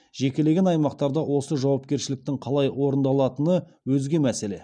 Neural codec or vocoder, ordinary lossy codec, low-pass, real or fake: none; none; 9.9 kHz; real